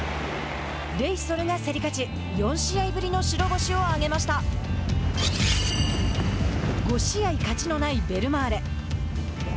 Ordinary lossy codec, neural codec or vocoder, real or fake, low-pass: none; none; real; none